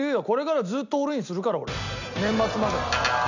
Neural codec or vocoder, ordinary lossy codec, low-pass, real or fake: none; none; 7.2 kHz; real